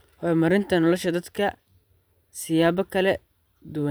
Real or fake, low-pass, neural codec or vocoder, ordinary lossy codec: fake; none; vocoder, 44.1 kHz, 128 mel bands every 512 samples, BigVGAN v2; none